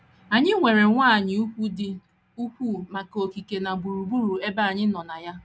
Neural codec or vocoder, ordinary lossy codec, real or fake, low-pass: none; none; real; none